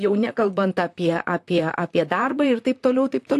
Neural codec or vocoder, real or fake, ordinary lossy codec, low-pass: vocoder, 44.1 kHz, 128 mel bands, Pupu-Vocoder; fake; AAC, 64 kbps; 14.4 kHz